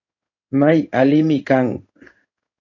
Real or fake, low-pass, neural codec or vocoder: fake; 7.2 kHz; codec, 16 kHz in and 24 kHz out, 1 kbps, XY-Tokenizer